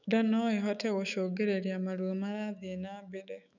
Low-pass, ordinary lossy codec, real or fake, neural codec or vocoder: 7.2 kHz; none; fake; autoencoder, 48 kHz, 128 numbers a frame, DAC-VAE, trained on Japanese speech